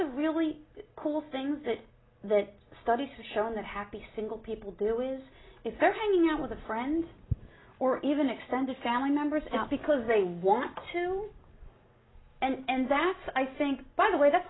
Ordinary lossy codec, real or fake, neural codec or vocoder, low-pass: AAC, 16 kbps; real; none; 7.2 kHz